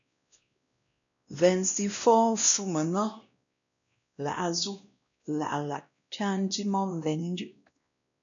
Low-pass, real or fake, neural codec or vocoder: 7.2 kHz; fake; codec, 16 kHz, 1 kbps, X-Codec, WavLM features, trained on Multilingual LibriSpeech